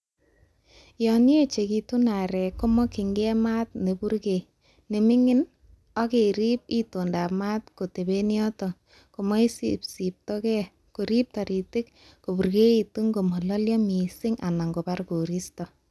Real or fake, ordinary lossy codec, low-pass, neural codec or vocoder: real; none; none; none